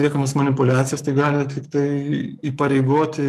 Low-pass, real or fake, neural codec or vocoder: 14.4 kHz; fake; codec, 44.1 kHz, 7.8 kbps, DAC